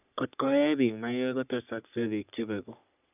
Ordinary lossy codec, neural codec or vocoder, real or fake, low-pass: none; codec, 44.1 kHz, 3.4 kbps, Pupu-Codec; fake; 3.6 kHz